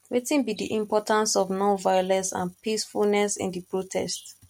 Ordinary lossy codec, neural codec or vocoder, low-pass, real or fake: MP3, 64 kbps; none; 19.8 kHz; real